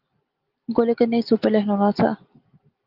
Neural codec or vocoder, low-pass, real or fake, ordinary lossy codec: none; 5.4 kHz; real; Opus, 24 kbps